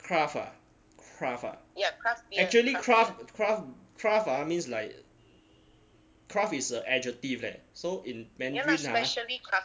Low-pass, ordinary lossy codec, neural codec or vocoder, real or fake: none; none; none; real